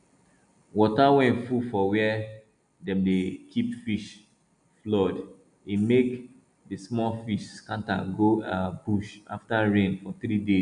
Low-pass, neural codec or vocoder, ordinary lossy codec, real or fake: 9.9 kHz; none; none; real